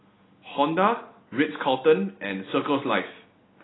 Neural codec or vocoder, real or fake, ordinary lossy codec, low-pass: none; real; AAC, 16 kbps; 7.2 kHz